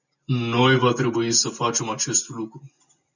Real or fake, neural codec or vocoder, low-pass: real; none; 7.2 kHz